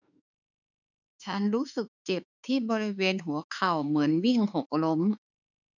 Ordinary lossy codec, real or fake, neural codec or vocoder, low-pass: none; fake; autoencoder, 48 kHz, 32 numbers a frame, DAC-VAE, trained on Japanese speech; 7.2 kHz